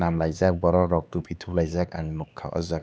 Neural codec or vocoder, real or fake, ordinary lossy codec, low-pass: codec, 16 kHz, 4 kbps, X-Codec, HuBERT features, trained on LibriSpeech; fake; none; none